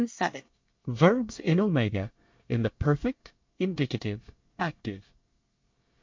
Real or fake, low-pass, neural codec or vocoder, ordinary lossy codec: fake; 7.2 kHz; codec, 24 kHz, 1 kbps, SNAC; MP3, 48 kbps